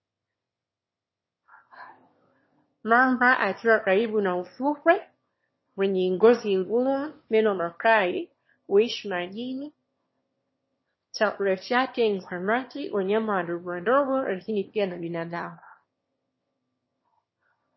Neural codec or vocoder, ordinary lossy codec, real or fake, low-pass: autoencoder, 22.05 kHz, a latent of 192 numbers a frame, VITS, trained on one speaker; MP3, 24 kbps; fake; 7.2 kHz